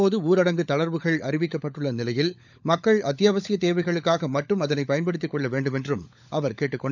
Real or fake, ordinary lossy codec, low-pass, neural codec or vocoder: fake; none; 7.2 kHz; codec, 16 kHz, 16 kbps, FunCodec, trained on LibriTTS, 50 frames a second